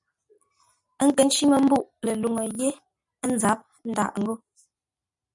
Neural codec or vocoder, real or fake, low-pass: none; real; 10.8 kHz